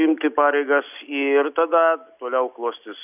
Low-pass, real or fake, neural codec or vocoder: 3.6 kHz; real; none